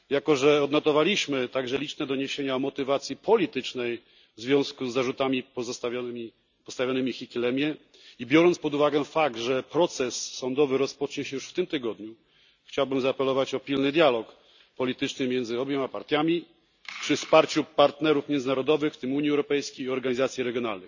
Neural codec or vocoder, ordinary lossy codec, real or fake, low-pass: none; none; real; 7.2 kHz